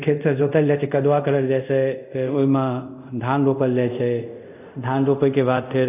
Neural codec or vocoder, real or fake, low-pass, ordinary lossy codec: codec, 24 kHz, 0.5 kbps, DualCodec; fake; 3.6 kHz; none